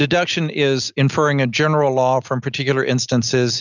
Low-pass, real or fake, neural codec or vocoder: 7.2 kHz; real; none